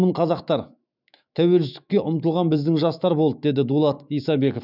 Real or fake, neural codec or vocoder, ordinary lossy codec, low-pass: fake; autoencoder, 48 kHz, 128 numbers a frame, DAC-VAE, trained on Japanese speech; none; 5.4 kHz